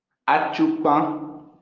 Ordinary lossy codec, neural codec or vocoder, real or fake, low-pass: Opus, 32 kbps; none; real; 7.2 kHz